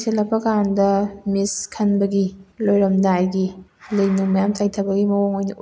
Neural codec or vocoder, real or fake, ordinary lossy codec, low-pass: none; real; none; none